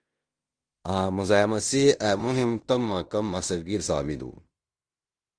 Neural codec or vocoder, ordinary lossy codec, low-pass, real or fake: codec, 16 kHz in and 24 kHz out, 0.9 kbps, LongCat-Audio-Codec, fine tuned four codebook decoder; Opus, 24 kbps; 9.9 kHz; fake